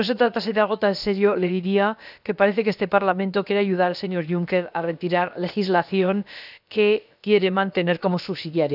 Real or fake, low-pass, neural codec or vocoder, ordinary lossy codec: fake; 5.4 kHz; codec, 16 kHz, about 1 kbps, DyCAST, with the encoder's durations; none